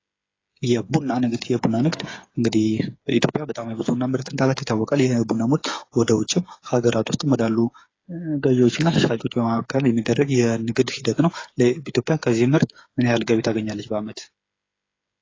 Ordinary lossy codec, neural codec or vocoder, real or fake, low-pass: AAC, 32 kbps; codec, 16 kHz, 8 kbps, FreqCodec, smaller model; fake; 7.2 kHz